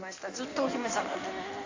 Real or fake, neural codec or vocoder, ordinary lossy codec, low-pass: fake; codec, 16 kHz in and 24 kHz out, 1.1 kbps, FireRedTTS-2 codec; AAC, 48 kbps; 7.2 kHz